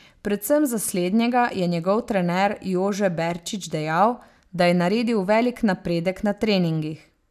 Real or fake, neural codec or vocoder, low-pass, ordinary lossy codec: real; none; 14.4 kHz; none